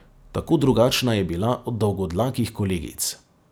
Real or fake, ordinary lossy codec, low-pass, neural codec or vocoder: real; none; none; none